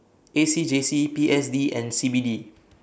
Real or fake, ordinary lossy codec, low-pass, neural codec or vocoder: real; none; none; none